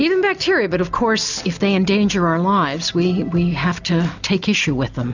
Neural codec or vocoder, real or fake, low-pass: none; real; 7.2 kHz